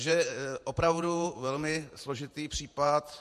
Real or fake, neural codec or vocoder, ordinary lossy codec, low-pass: fake; vocoder, 48 kHz, 128 mel bands, Vocos; MP3, 64 kbps; 14.4 kHz